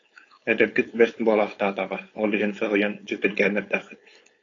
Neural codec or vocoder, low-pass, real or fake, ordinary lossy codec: codec, 16 kHz, 4.8 kbps, FACodec; 7.2 kHz; fake; AAC, 32 kbps